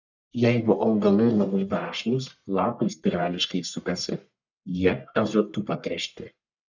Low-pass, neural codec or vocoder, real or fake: 7.2 kHz; codec, 44.1 kHz, 1.7 kbps, Pupu-Codec; fake